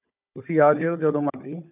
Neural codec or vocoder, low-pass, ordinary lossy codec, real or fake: codec, 16 kHz, 16 kbps, FunCodec, trained on Chinese and English, 50 frames a second; 3.6 kHz; none; fake